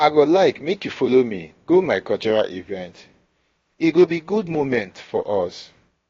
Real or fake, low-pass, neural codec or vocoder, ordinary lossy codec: fake; 7.2 kHz; codec, 16 kHz, about 1 kbps, DyCAST, with the encoder's durations; AAC, 32 kbps